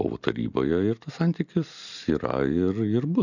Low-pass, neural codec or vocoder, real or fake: 7.2 kHz; none; real